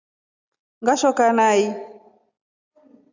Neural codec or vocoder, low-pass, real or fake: none; 7.2 kHz; real